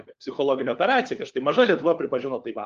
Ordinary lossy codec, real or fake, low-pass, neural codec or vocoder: Opus, 32 kbps; fake; 7.2 kHz; codec, 16 kHz, 4 kbps, FunCodec, trained on LibriTTS, 50 frames a second